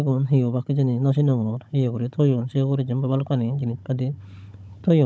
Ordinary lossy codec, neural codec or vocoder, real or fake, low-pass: none; codec, 16 kHz, 16 kbps, FunCodec, trained on Chinese and English, 50 frames a second; fake; none